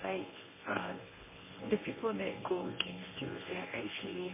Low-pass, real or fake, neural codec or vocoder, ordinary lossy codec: 3.6 kHz; fake; codec, 24 kHz, 0.9 kbps, WavTokenizer, medium speech release version 1; MP3, 16 kbps